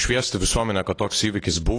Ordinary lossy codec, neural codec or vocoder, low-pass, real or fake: AAC, 32 kbps; none; 9.9 kHz; real